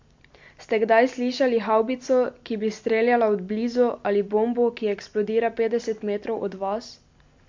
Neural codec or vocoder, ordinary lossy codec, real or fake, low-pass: none; MP3, 48 kbps; real; 7.2 kHz